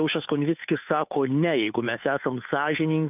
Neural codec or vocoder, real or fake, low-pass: codec, 16 kHz, 2 kbps, FunCodec, trained on Chinese and English, 25 frames a second; fake; 3.6 kHz